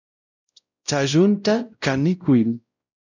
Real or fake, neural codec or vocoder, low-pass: fake; codec, 16 kHz, 0.5 kbps, X-Codec, WavLM features, trained on Multilingual LibriSpeech; 7.2 kHz